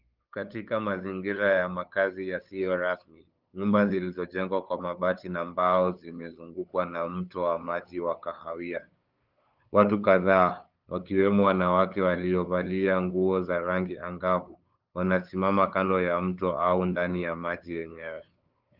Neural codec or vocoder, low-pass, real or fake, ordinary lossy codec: codec, 16 kHz, 8 kbps, FunCodec, trained on LibriTTS, 25 frames a second; 5.4 kHz; fake; Opus, 24 kbps